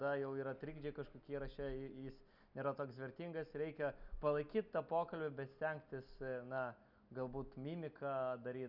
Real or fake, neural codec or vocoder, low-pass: real; none; 5.4 kHz